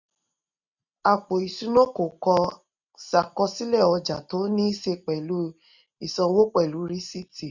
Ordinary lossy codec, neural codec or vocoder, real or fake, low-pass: none; none; real; 7.2 kHz